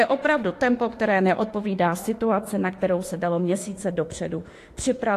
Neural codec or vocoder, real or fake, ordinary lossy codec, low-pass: autoencoder, 48 kHz, 32 numbers a frame, DAC-VAE, trained on Japanese speech; fake; AAC, 48 kbps; 14.4 kHz